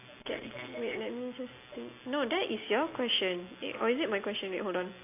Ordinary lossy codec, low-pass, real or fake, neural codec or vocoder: AAC, 24 kbps; 3.6 kHz; real; none